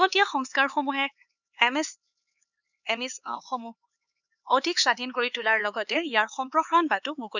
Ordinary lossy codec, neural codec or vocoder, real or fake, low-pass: none; codec, 16 kHz, 4 kbps, X-Codec, HuBERT features, trained on LibriSpeech; fake; 7.2 kHz